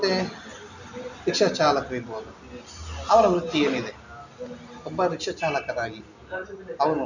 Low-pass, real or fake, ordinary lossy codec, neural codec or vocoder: 7.2 kHz; real; none; none